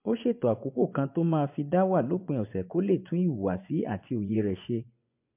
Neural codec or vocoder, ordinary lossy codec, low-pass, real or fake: vocoder, 24 kHz, 100 mel bands, Vocos; MP3, 32 kbps; 3.6 kHz; fake